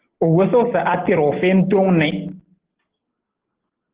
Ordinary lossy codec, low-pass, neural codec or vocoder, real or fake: Opus, 16 kbps; 3.6 kHz; none; real